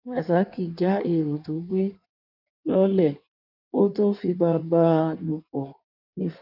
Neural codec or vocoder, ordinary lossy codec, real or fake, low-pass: codec, 16 kHz in and 24 kHz out, 1.1 kbps, FireRedTTS-2 codec; none; fake; 5.4 kHz